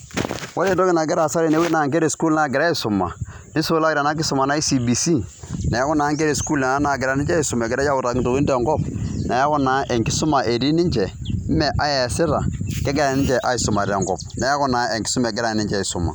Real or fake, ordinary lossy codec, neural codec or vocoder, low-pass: real; none; none; none